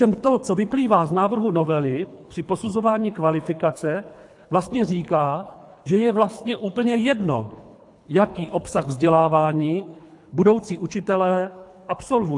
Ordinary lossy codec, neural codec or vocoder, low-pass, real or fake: AAC, 64 kbps; codec, 24 kHz, 3 kbps, HILCodec; 10.8 kHz; fake